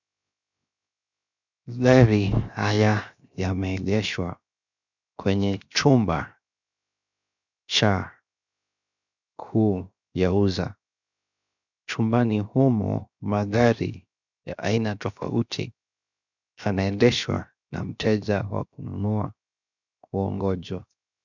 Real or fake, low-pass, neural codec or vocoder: fake; 7.2 kHz; codec, 16 kHz, 0.7 kbps, FocalCodec